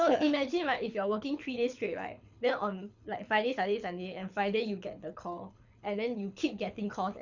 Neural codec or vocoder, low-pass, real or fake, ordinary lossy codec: codec, 24 kHz, 6 kbps, HILCodec; 7.2 kHz; fake; none